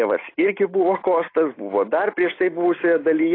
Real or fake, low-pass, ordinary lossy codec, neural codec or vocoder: real; 5.4 kHz; AAC, 24 kbps; none